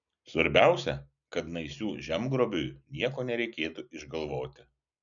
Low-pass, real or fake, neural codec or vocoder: 7.2 kHz; real; none